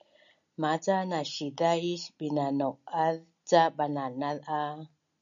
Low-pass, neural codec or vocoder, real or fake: 7.2 kHz; none; real